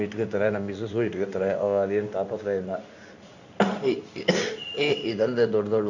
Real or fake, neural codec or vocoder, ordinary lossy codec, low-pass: fake; codec, 16 kHz in and 24 kHz out, 1 kbps, XY-Tokenizer; none; 7.2 kHz